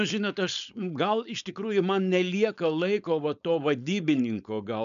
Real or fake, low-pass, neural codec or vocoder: fake; 7.2 kHz; codec, 16 kHz, 4.8 kbps, FACodec